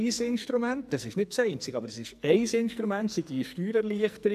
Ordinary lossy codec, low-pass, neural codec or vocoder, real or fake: none; 14.4 kHz; codec, 44.1 kHz, 2.6 kbps, SNAC; fake